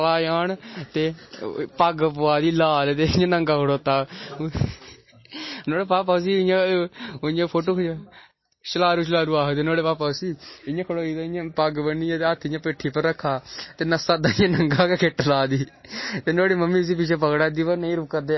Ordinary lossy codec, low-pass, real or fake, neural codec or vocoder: MP3, 24 kbps; 7.2 kHz; real; none